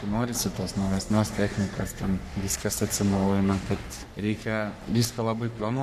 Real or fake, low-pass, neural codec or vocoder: fake; 14.4 kHz; codec, 44.1 kHz, 3.4 kbps, Pupu-Codec